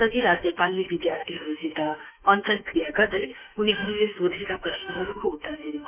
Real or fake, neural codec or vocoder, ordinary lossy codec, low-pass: fake; autoencoder, 48 kHz, 32 numbers a frame, DAC-VAE, trained on Japanese speech; none; 3.6 kHz